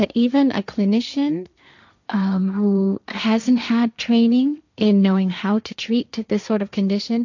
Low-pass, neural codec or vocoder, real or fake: 7.2 kHz; codec, 16 kHz, 1.1 kbps, Voila-Tokenizer; fake